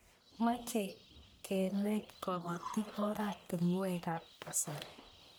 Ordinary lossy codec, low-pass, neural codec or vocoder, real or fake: none; none; codec, 44.1 kHz, 1.7 kbps, Pupu-Codec; fake